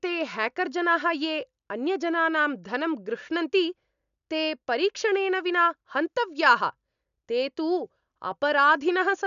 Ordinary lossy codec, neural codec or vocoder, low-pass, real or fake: none; none; 7.2 kHz; real